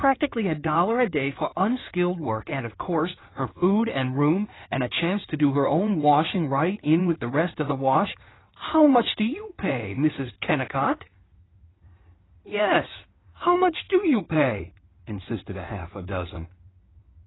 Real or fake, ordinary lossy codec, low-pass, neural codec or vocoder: fake; AAC, 16 kbps; 7.2 kHz; codec, 16 kHz in and 24 kHz out, 2.2 kbps, FireRedTTS-2 codec